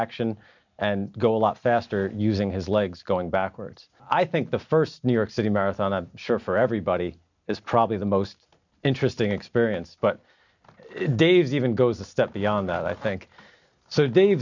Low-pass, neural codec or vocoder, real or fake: 7.2 kHz; none; real